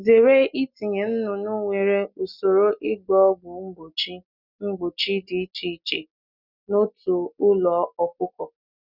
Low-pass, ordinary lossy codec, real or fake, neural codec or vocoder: 5.4 kHz; none; real; none